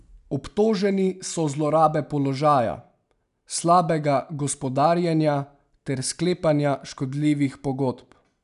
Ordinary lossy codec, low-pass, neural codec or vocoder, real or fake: none; 10.8 kHz; none; real